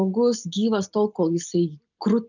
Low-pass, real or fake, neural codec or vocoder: 7.2 kHz; real; none